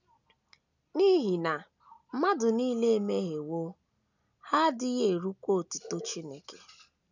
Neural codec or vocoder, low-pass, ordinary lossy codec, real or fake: none; 7.2 kHz; none; real